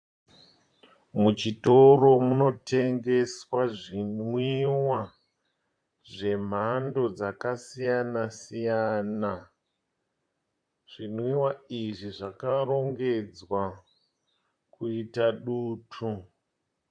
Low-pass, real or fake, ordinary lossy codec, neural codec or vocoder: 9.9 kHz; fake; AAC, 64 kbps; vocoder, 44.1 kHz, 128 mel bands, Pupu-Vocoder